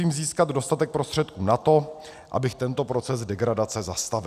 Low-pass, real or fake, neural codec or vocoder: 14.4 kHz; real; none